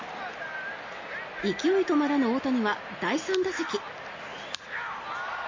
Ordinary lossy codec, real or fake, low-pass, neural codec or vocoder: MP3, 32 kbps; real; 7.2 kHz; none